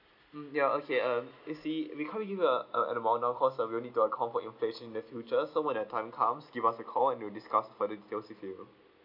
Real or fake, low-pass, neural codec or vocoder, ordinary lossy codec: real; 5.4 kHz; none; none